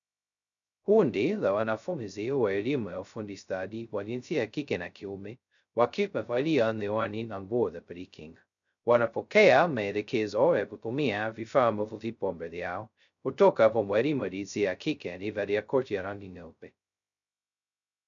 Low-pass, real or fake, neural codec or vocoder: 7.2 kHz; fake; codec, 16 kHz, 0.2 kbps, FocalCodec